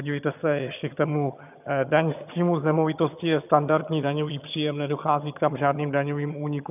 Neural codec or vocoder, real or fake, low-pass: vocoder, 22.05 kHz, 80 mel bands, HiFi-GAN; fake; 3.6 kHz